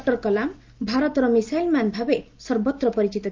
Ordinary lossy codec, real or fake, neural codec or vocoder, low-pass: Opus, 32 kbps; real; none; 7.2 kHz